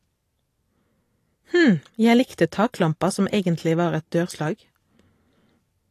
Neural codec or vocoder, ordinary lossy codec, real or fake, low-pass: vocoder, 44.1 kHz, 128 mel bands every 512 samples, BigVGAN v2; AAC, 48 kbps; fake; 14.4 kHz